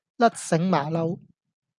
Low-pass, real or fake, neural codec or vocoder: 10.8 kHz; real; none